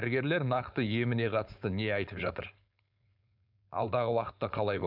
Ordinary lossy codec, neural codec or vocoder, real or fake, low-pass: none; codec, 16 kHz, 4.8 kbps, FACodec; fake; 5.4 kHz